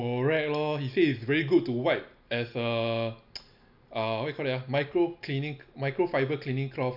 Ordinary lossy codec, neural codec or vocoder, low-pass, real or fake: none; none; 5.4 kHz; real